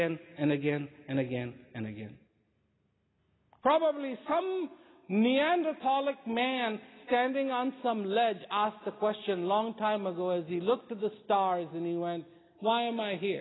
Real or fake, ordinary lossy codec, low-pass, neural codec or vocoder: real; AAC, 16 kbps; 7.2 kHz; none